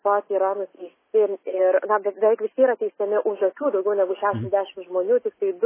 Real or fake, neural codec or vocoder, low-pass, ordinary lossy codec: real; none; 3.6 kHz; MP3, 16 kbps